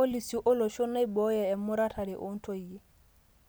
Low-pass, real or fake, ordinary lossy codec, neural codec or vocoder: none; real; none; none